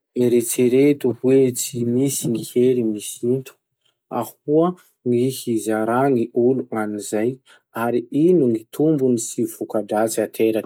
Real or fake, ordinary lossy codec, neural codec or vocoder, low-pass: real; none; none; none